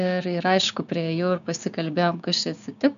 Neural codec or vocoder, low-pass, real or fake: codec, 16 kHz, 6 kbps, DAC; 7.2 kHz; fake